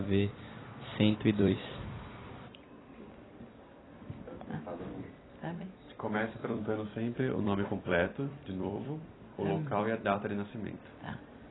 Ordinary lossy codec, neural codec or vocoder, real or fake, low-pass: AAC, 16 kbps; vocoder, 44.1 kHz, 128 mel bands every 256 samples, BigVGAN v2; fake; 7.2 kHz